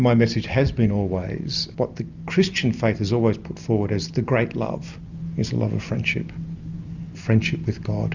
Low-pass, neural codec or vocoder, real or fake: 7.2 kHz; none; real